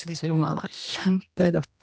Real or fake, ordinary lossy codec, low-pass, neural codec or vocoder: fake; none; none; codec, 16 kHz, 1 kbps, X-Codec, HuBERT features, trained on general audio